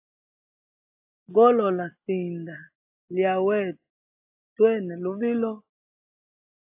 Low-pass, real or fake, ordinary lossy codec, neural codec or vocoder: 3.6 kHz; real; AAC, 32 kbps; none